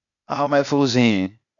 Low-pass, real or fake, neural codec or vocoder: 7.2 kHz; fake; codec, 16 kHz, 0.8 kbps, ZipCodec